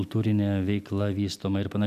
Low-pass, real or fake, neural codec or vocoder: 14.4 kHz; real; none